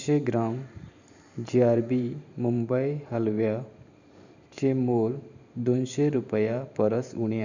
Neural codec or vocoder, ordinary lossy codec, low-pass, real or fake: vocoder, 44.1 kHz, 128 mel bands every 512 samples, BigVGAN v2; none; 7.2 kHz; fake